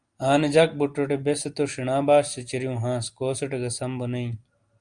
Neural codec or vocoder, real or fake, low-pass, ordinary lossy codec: none; real; 9.9 kHz; Opus, 32 kbps